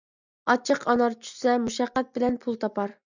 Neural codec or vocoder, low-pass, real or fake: none; 7.2 kHz; real